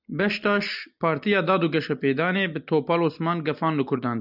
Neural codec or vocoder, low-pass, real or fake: none; 5.4 kHz; real